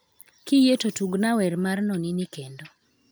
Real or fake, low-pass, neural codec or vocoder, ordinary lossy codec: real; none; none; none